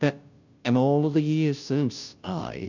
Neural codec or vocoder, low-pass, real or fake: codec, 16 kHz, 0.5 kbps, FunCodec, trained on Chinese and English, 25 frames a second; 7.2 kHz; fake